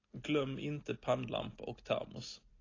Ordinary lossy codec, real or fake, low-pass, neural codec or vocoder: AAC, 32 kbps; real; 7.2 kHz; none